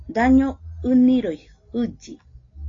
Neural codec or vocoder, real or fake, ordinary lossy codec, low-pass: none; real; AAC, 32 kbps; 7.2 kHz